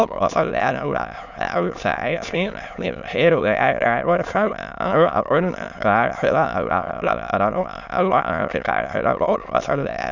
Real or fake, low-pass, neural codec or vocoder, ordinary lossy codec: fake; 7.2 kHz; autoencoder, 22.05 kHz, a latent of 192 numbers a frame, VITS, trained on many speakers; none